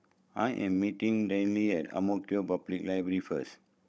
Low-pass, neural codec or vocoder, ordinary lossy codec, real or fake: none; codec, 16 kHz, 16 kbps, FunCodec, trained on Chinese and English, 50 frames a second; none; fake